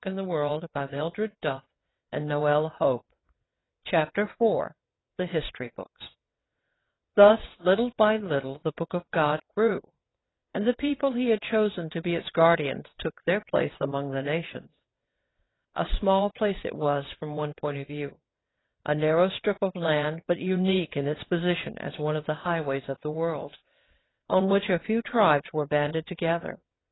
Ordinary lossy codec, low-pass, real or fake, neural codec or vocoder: AAC, 16 kbps; 7.2 kHz; fake; vocoder, 22.05 kHz, 80 mel bands, WaveNeXt